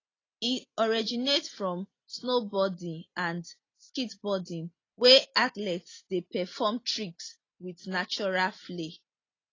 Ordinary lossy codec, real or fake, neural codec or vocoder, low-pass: AAC, 32 kbps; real; none; 7.2 kHz